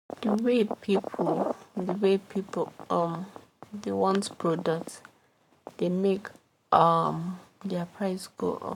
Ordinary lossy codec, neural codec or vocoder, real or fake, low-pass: none; codec, 44.1 kHz, 7.8 kbps, Pupu-Codec; fake; 19.8 kHz